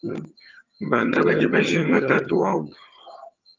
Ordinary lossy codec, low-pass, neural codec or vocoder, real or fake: Opus, 24 kbps; 7.2 kHz; vocoder, 22.05 kHz, 80 mel bands, HiFi-GAN; fake